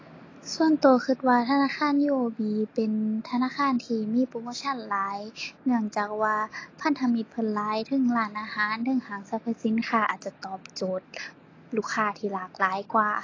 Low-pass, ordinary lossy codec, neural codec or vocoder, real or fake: 7.2 kHz; AAC, 32 kbps; none; real